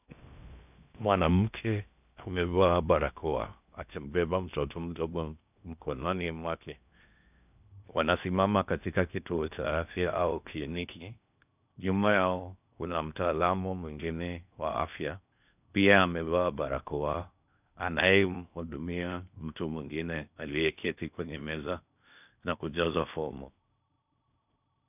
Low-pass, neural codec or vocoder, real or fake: 3.6 kHz; codec, 16 kHz in and 24 kHz out, 0.6 kbps, FocalCodec, streaming, 2048 codes; fake